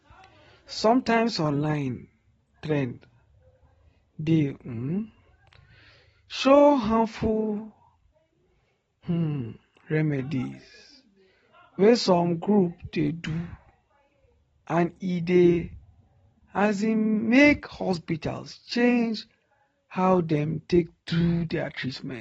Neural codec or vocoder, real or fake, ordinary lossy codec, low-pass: none; real; AAC, 24 kbps; 19.8 kHz